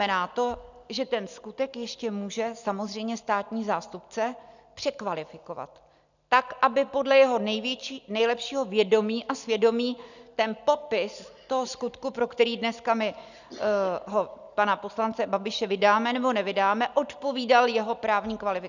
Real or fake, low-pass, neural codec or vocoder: real; 7.2 kHz; none